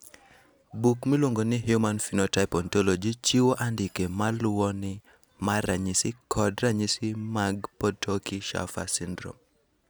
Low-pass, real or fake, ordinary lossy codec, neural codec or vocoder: none; real; none; none